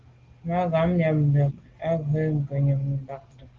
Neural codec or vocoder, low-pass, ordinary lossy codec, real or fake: none; 7.2 kHz; Opus, 16 kbps; real